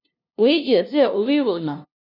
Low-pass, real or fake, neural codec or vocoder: 5.4 kHz; fake; codec, 16 kHz, 0.5 kbps, FunCodec, trained on LibriTTS, 25 frames a second